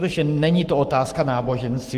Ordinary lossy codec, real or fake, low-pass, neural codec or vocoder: Opus, 24 kbps; fake; 14.4 kHz; codec, 44.1 kHz, 7.8 kbps, Pupu-Codec